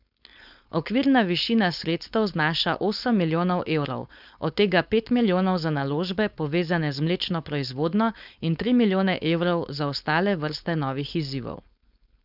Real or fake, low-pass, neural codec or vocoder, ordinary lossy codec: fake; 5.4 kHz; codec, 16 kHz, 4.8 kbps, FACodec; none